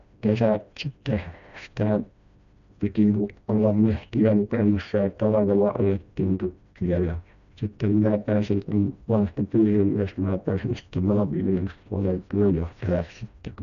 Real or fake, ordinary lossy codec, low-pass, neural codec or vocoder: fake; none; 7.2 kHz; codec, 16 kHz, 1 kbps, FreqCodec, smaller model